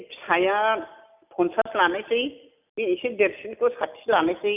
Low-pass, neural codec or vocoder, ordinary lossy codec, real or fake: 3.6 kHz; none; none; real